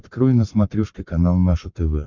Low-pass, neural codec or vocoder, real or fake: 7.2 kHz; codec, 44.1 kHz, 7.8 kbps, Pupu-Codec; fake